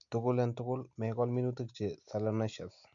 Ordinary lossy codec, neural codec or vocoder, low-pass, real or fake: none; none; 7.2 kHz; real